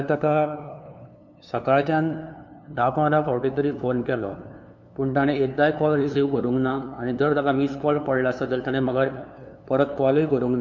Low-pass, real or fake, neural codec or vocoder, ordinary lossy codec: 7.2 kHz; fake; codec, 16 kHz, 2 kbps, FunCodec, trained on LibriTTS, 25 frames a second; none